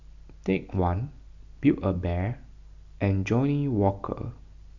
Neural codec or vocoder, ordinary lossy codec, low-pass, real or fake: none; none; 7.2 kHz; real